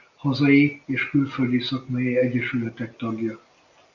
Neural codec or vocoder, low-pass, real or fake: none; 7.2 kHz; real